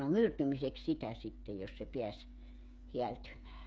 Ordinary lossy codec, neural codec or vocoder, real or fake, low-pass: none; codec, 16 kHz, 8 kbps, FreqCodec, smaller model; fake; none